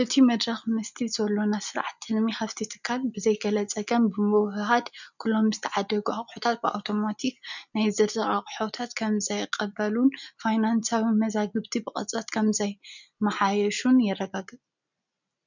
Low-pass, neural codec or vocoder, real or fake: 7.2 kHz; none; real